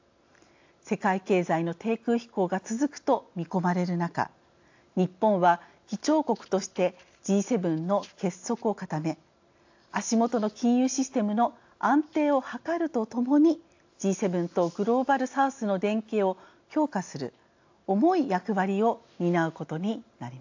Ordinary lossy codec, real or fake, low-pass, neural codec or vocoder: AAC, 48 kbps; real; 7.2 kHz; none